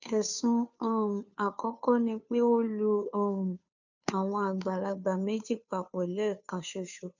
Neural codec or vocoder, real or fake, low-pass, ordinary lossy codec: codec, 16 kHz, 2 kbps, FunCodec, trained on Chinese and English, 25 frames a second; fake; 7.2 kHz; none